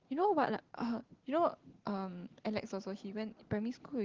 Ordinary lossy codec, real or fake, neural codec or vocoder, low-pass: Opus, 16 kbps; real; none; 7.2 kHz